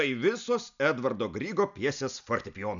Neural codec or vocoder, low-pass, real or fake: none; 7.2 kHz; real